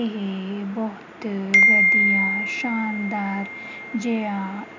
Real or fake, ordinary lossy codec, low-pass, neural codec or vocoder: real; none; 7.2 kHz; none